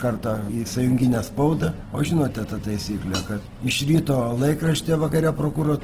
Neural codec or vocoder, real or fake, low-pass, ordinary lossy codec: none; real; 14.4 kHz; Opus, 16 kbps